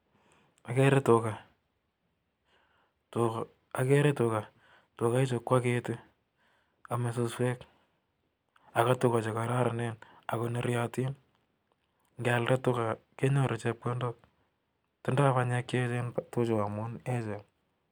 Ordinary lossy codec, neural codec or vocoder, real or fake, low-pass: none; none; real; none